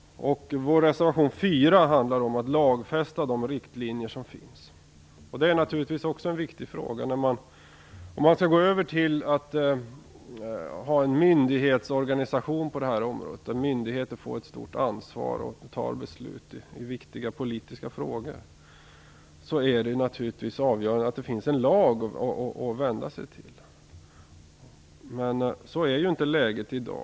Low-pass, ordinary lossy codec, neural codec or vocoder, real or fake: none; none; none; real